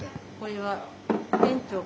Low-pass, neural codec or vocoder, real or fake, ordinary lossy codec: none; none; real; none